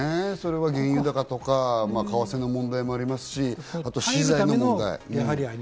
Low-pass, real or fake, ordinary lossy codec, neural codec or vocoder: none; real; none; none